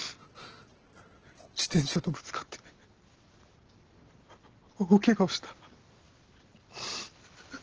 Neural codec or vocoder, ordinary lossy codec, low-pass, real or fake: none; Opus, 16 kbps; 7.2 kHz; real